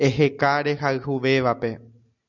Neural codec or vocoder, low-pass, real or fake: none; 7.2 kHz; real